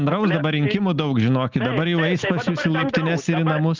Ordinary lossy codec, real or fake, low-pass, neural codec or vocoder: Opus, 24 kbps; real; 7.2 kHz; none